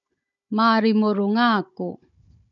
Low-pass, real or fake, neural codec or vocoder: 7.2 kHz; fake; codec, 16 kHz, 16 kbps, FunCodec, trained on Chinese and English, 50 frames a second